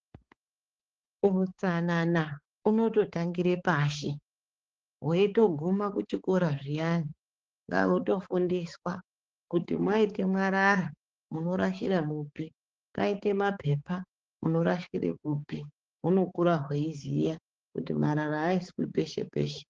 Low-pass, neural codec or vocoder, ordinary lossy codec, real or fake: 7.2 kHz; codec, 16 kHz, 4 kbps, X-Codec, HuBERT features, trained on balanced general audio; Opus, 16 kbps; fake